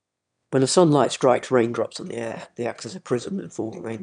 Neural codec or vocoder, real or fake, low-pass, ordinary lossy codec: autoencoder, 22.05 kHz, a latent of 192 numbers a frame, VITS, trained on one speaker; fake; 9.9 kHz; none